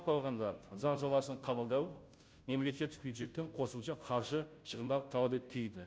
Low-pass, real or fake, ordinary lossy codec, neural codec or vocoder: none; fake; none; codec, 16 kHz, 0.5 kbps, FunCodec, trained on Chinese and English, 25 frames a second